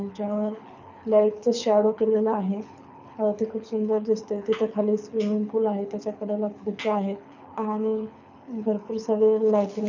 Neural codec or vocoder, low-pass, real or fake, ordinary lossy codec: codec, 24 kHz, 6 kbps, HILCodec; 7.2 kHz; fake; none